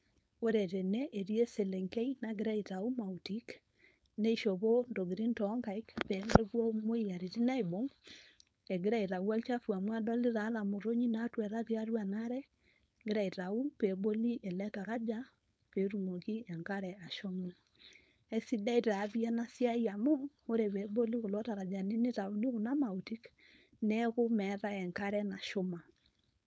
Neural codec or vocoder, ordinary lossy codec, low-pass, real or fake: codec, 16 kHz, 4.8 kbps, FACodec; none; none; fake